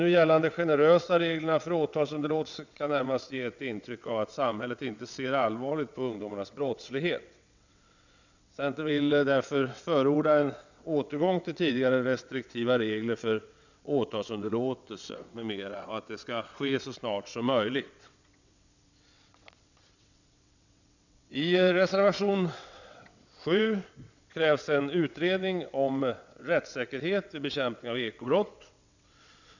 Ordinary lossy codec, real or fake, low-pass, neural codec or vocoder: none; fake; 7.2 kHz; vocoder, 22.05 kHz, 80 mel bands, WaveNeXt